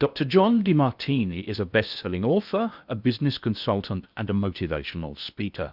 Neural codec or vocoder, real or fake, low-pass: codec, 16 kHz in and 24 kHz out, 0.8 kbps, FocalCodec, streaming, 65536 codes; fake; 5.4 kHz